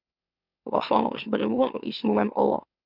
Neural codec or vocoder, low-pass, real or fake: autoencoder, 44.1 kHz, a latent of 192 numbers a frame, MeloTTS; 5.4 kHz; fake